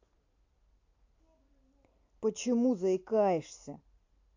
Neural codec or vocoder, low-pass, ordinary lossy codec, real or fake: none; 7.2 kHz; AAC, 48 kbps; real